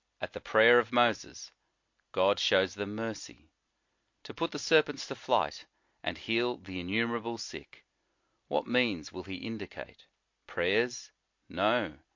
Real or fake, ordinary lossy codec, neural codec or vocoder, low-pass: real; MP3, 48 kbps; none; 7.2 kHz